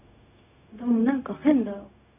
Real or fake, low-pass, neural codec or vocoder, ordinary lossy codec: fake; 3.6 kHz; codec, 16 kHz, 0.4 kbps, LongCat-Audio-Codec; none